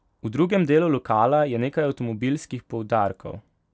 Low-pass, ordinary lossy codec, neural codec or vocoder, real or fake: none; none; none; real